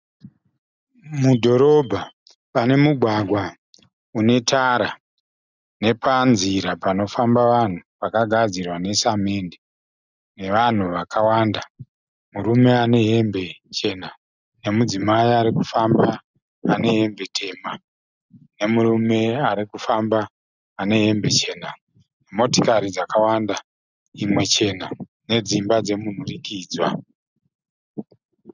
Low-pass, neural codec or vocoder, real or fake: 7.2 kHz; none; real